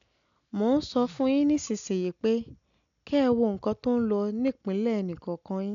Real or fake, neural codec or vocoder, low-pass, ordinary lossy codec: real; none; 7.2 kHz; MP3, 96 kbps